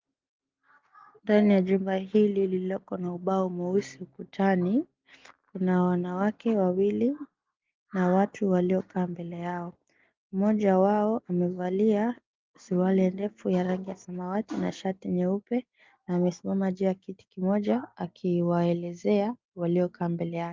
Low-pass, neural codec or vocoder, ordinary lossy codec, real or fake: 7.2 kHz; none; Opus, 32 kbps; real